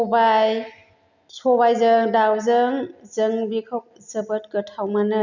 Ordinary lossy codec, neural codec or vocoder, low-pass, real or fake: none; none; 7.2 kHz; real